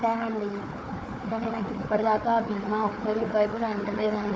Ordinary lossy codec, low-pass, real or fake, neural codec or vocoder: none; none; fake; codec, 16 kHz, 4 kbps, FunCodec, trained on Chinese and English, 50 frames a second